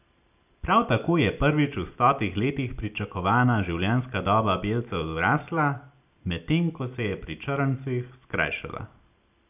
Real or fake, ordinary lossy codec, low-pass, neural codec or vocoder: real; none; 3.6 kHz; none